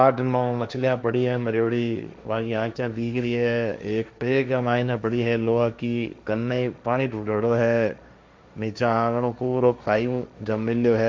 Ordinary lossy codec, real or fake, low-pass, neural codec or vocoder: none; fake; none; codec, 16 kHz, 1.1 kbps, Voila-Tokenizer